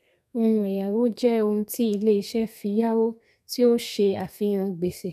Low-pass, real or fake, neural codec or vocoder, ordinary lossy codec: 14.4 kHz; fake; codec, 32 kHz, 1.9 kbps, SNAC; none